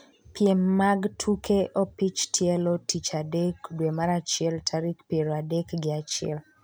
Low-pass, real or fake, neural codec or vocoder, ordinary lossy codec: none; real; none; none